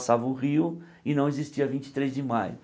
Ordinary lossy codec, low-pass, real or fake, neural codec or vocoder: none; none; real; none